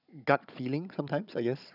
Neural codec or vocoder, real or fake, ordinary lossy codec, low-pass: codec, 16 kHz, 16 kbps, FunCodec, trained on Chinese and English, 50 frames a second; fake; none; 5.4 kHz